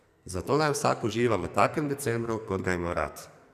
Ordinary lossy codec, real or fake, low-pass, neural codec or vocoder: none; fake; 14.4 kHz; codec, 44.1 kHz, 2.6 kbps, SNAC